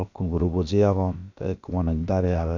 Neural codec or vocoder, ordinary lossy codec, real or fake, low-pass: codec, 16 kHz, about 1 kbps, DyCAST, with the encoder's durations; none; fake; 7.2 kHz